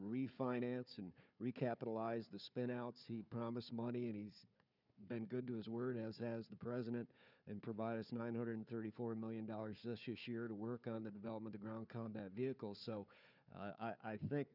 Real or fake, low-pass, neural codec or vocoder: fake; 5.4 kHz; codec, 16 kHz, 4 kbps, FunCodec, trained on Chinese and English, 50 frames a second